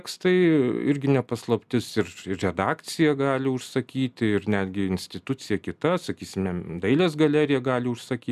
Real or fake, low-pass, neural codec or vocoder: real; 14.4 kHz; none